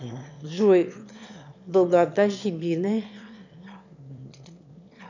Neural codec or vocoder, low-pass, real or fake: autoencoder, 22.05 kHz, a latent of 192 numbers a frame, VITS, trained on one speaker; 7.2 kHz; fake